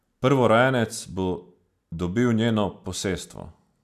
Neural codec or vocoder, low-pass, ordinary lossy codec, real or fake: none; 14.4 kHz; none; real